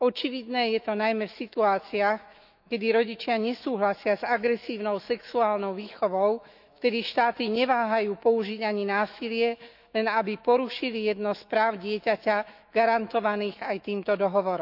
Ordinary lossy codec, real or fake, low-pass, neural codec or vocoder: none; fake; 5.4 kHz; autoencoder, 48 kHz, 128 numbers a frame, DAC-VAE, trained on Japanese speech